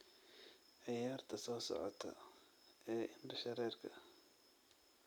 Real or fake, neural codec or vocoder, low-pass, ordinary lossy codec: real; none; none; none